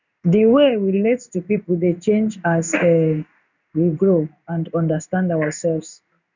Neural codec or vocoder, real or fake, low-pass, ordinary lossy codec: codec, 16 kHz in and 24 kHz out, 1 kbps, XY-Tokenizer; fake; 7.2 kHz; none